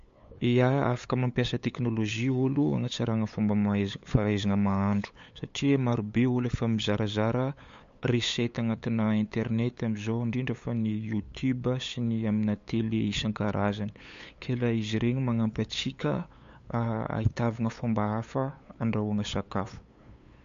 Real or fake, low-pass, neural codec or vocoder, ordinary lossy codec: fake; 7.2 kHz; codec, 16 kHz, 8 kbps, FunCodec, trained on LibriTTS, 25 frames a second; MP3, 48 kbps